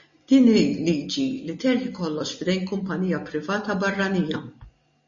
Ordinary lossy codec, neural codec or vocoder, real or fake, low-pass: MP3, 32 kbps; none; real; 7.2 kHz